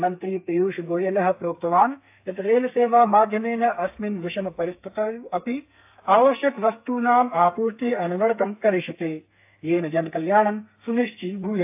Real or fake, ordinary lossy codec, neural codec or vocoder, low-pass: fake; AAC, 32 kbps; codec, 32 kHz, 1.9 kbps, SNAC; 3.6 kHz